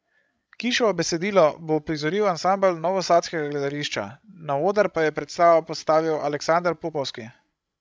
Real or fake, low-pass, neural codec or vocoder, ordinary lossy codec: fake; none; codec, 16 kHz, 16 kbps, FreqCodec, larger model; none